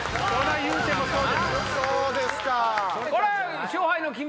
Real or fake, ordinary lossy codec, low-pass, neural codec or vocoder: real; none; none; none